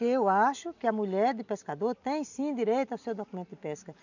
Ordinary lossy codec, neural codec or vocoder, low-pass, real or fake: none; none; 7.2 kHz; real